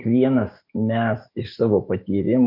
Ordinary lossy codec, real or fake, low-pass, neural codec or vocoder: MP3, 32 kbps; fake; 5.4 kHz; autoencoder, 48 kHz, 128 numbers a frame, DAC-VAE, trained on Japanese speech